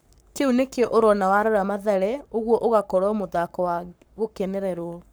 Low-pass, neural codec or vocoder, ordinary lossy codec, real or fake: none; codec, 44.1 kHz, 7.8 kbps, Pupu-Codec; none; fake